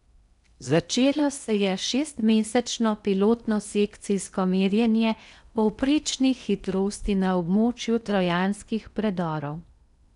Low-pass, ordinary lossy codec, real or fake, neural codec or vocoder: 10.8 kHz; none; fake; codec, 16 kHz in and 24 kHz out, 0.8 kbps, FocalCodec, streaming, 65536 codes